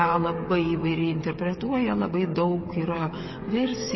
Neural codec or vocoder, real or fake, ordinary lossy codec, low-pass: codec, 16 kHz, 16 kbps, FreqCodec, smaller model; fake; MP3, 24 kbps; 7.2 kHz